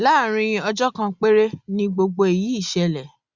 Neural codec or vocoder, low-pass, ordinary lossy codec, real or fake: none; 7.2 kHz; Opus, 64 kbps; real